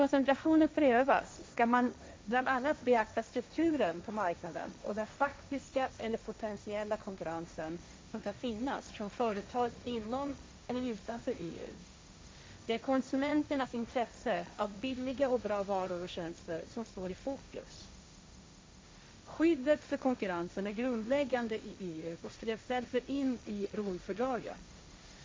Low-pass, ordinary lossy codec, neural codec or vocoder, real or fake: none; none; codec, 16 kHz, 1.1 kbps, Voila-Tokenizer; fake